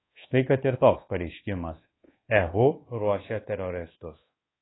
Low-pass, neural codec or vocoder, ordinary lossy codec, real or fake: 7.2 kHz; codec, 24 kHz, 1.2 kbps, DualCodec; AAC, 16 kbps; fake